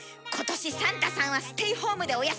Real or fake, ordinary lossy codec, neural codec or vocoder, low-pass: real; none; none; none